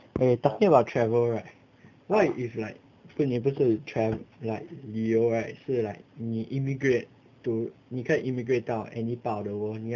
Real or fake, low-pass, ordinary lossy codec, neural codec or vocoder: real; 7.2 kHz; none; none